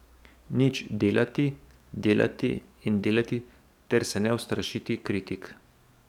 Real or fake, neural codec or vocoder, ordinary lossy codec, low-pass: fake; codec, 44.1 kHz, 7.8 kbps, DAC; none; 19.8 kHz